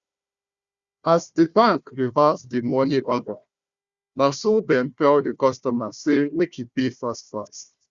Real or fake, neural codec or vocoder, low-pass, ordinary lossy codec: fake; codec, 16 kHz, 1 kbps, FunCodec, trained on Chinese and English, 50 frames a second; 7.2 kHz; Opus, 64 kbps